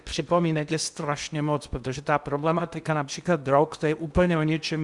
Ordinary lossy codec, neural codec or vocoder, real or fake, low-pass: Opus, 64 kbps; codec, 16 kHz in and 24 kHz out, 0.8 kbps, FocalCodec, streaming, 65536 codes; fake; 10.8 kHz